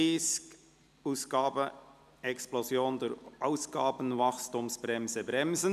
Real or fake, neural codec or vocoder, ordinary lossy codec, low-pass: real; none; none; 14.4 kHz